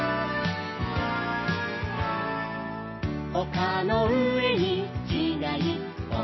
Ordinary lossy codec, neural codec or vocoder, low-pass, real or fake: MP3, 24 kbps; none; 7.2 kHz; real